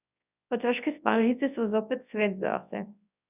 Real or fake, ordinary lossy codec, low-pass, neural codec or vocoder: fake; none; 3.6 kHz; codec, 24 kHz, 0.9 kbps, WavTokenizer, large speech release